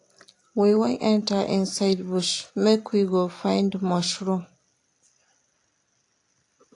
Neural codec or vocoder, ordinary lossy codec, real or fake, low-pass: none; AAC, 48 kbps; real; 10.8 kHz